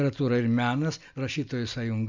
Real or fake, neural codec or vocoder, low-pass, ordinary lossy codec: real; none; 7.2 kHz; MP3, 64 kbps